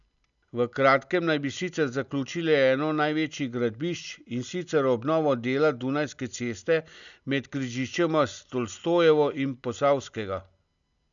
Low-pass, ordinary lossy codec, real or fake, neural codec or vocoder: 7.2 kHz; none; real; none